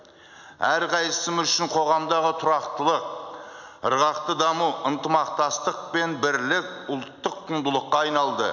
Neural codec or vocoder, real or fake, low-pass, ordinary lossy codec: none; real; 7.2 kHz; none